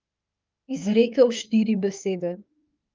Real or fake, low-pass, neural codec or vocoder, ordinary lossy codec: fake; 7.2 kHz; autoencoder, 48 kHz, 32 numbers a frame, DAC-VAE, trained on Japanese speech; Opus, 24 kbps